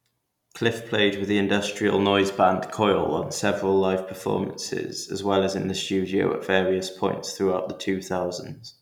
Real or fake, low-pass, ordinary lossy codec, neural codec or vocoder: real; 19.8 kHz; none; none